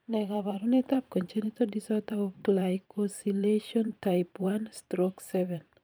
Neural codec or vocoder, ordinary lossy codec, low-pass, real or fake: vocoder, 44.1 kHz, 128 mel bands every 512 samples, BigVGAN v2; none; none; fake